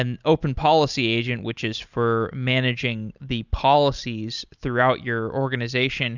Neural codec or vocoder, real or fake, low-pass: none; real; 7.2 kHz